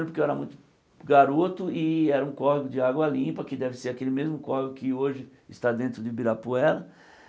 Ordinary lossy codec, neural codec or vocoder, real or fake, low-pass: none; none; real; none